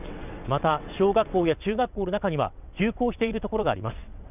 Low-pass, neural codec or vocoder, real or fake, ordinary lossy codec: 3.6 kHz; vocoder, 22.05 kHz, 80 mel bands, WaveNeXt; fake; none